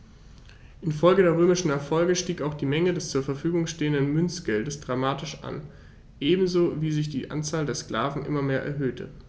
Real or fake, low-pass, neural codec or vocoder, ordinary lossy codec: real; none; none; none